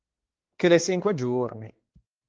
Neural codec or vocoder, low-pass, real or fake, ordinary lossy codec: codec, 16 kHz, 2 kbps, X-Codec, HuBERT features, trained on balanced general audio; 7.2 kHz; fake; Opus, 16 kbps